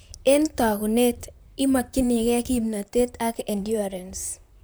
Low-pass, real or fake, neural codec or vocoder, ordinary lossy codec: none; fake; vocoder, 44.1 kHz, 128 mel bands, Pupu-Vocoder; none